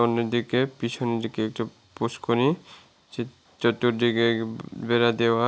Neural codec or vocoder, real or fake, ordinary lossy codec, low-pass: none; real; none; none